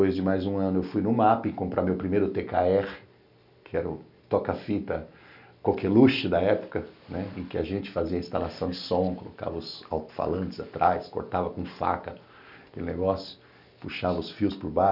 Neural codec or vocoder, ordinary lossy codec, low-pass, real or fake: none; none; 5.4 kHz; real